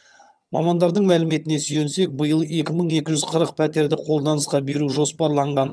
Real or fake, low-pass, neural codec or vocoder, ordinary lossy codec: fake; none; vocoder, 22.05 kHz, 80 mel bands, HiFi-GAN; none